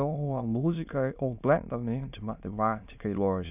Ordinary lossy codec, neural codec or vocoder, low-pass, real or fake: none; autoencoder, 22.05 kHz, a latent of 192 numbers a frame, VITS, trained on many speakers; 3.6 kHz; fake